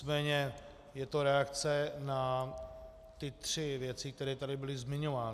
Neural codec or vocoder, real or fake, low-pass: none; real; 14.4 kHz